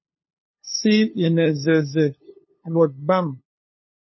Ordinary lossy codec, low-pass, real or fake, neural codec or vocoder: MP3, 24 kbps; 7.2 kHz; fake; codec, 16 kHz, 2 kbps, FunCodec, trained on LibriTTS, 25 frames a second